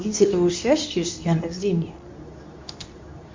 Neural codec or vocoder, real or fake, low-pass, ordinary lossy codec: codec, 24 kHz, 0.9 kbps, WavTokenizer, medium speech release version 2; fake; 7.2 kHz; MP3, 64 kbps